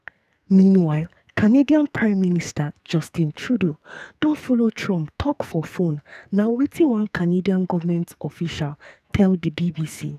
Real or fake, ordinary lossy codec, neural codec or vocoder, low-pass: fake; none; codec, 44.1 kHz, 2.6 kbps, SNAC; 14.4 kHz